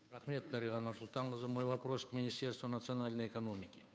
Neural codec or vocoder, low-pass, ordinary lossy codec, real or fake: codec, 16 kHz, 2 kbps, FunCodec, trained on Chinese and English, 25 frames a second; none; none; fake